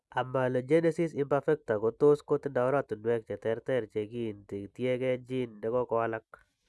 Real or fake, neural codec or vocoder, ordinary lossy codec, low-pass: real; none; none; none